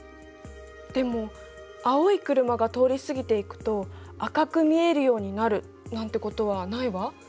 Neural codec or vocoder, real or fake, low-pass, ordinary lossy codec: none; real; none; none